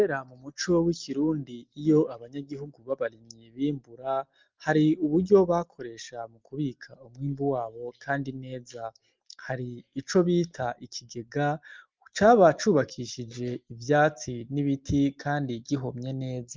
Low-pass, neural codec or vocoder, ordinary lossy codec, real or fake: 7.2 kHz; none; Opus, 32 kbps; real